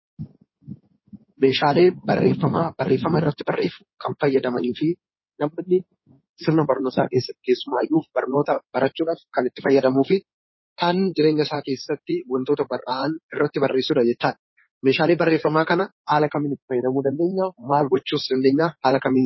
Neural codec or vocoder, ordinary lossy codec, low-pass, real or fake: codec, 16 kHz in and 24 kHz out, 2.2 kbps, FireRedTTS-2 codec; MP3, 24 kbps; 7.2 kHz; fake